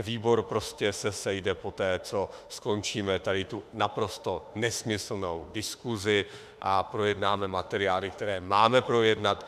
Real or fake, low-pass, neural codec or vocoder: fake; 14.4 kHz; autoencoder, 48 kHz, 32 numbers a frame, DAC-VAE, trained on Japanese speech